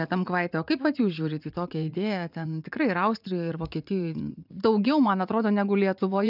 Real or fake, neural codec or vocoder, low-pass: fake; vocoder, 44.1 kHz, 80 mel bands, Vocos; 5.4 kHz